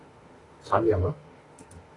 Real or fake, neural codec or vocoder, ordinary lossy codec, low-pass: fake; autoencoder, 48 kHz, 32 numbers a frame, DAC-VAE, trained on Japanese speech; AAC, 32 kbps; 10.8 kHz